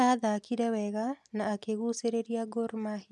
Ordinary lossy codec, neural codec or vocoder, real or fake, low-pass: none; none; real; none